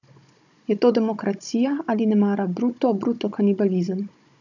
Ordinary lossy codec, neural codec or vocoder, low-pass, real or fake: none; codec, 16 kHz, 16 kbps, FunCodec, trained on Chinese and English, 50 frames a second; 7.2 kHz; fake